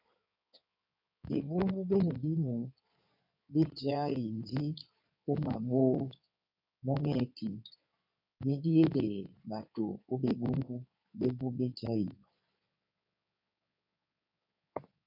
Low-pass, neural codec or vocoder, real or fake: 5.4 kHz; codec, 16 kHz in and 24 kHz out, 2.2 kbps, FireRedTTS-2 codec; fake